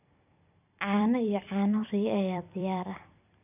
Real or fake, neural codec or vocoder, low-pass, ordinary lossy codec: fake; vocoder, 44.1 kHz, 128 mel bands every 256 samples, BigVGAN v2; 3.6 kHz; none